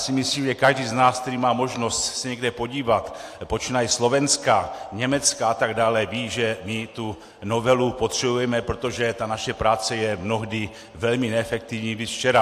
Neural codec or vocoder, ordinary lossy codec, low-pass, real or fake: none; AAC, 64 kbps; 14.4 kHz; real